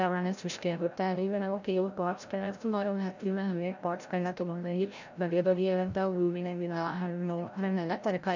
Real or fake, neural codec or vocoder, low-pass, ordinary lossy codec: fake; codec, 16 kHz, 0.5 kbps, FreqCodec, larger model; 7.2 kHz; none